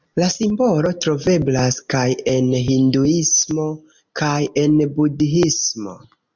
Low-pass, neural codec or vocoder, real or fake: 7.2 kHz; none; real